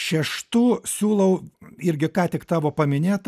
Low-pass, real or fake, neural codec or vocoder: 14.4 kHz; real; none